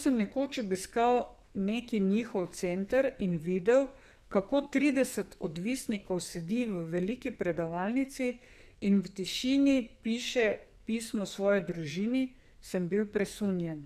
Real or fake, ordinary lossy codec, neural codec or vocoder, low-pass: fake; none; codec, 44.1 kHz, 2.6 kbps, SNAC; 14.4 kHz